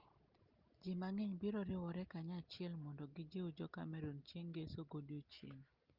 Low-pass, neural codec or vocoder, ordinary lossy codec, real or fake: 5.4 kHz; none; Opus, 32 kbps; real